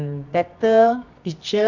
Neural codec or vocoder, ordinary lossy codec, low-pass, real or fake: codec, 24 kHz, 0.9 kbps, WavTokenizer, medium music audio release; none; 7.2 kHz; fake